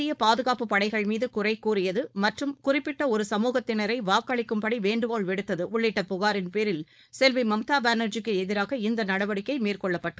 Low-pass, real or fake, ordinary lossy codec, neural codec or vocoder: none; fake; none; codec, 16 kHz, 4.8 kbps, FACodec